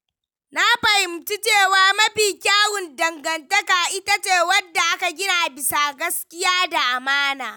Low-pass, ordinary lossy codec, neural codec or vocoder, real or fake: none; none; none; real